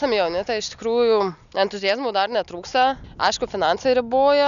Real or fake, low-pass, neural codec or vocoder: real; 7.2 kHz; none